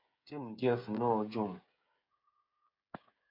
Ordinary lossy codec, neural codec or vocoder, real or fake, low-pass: MP3, 48 kbps; codec, 16 kHz, 8 kbps, FreqCodec, smaller model; fake; 5.4 kHz